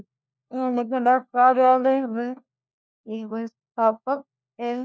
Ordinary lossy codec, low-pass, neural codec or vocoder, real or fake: none; none; codec, 16 kHz, 1 kbps, FunCodec, trained on LibriTTS, 50 frames a second; fake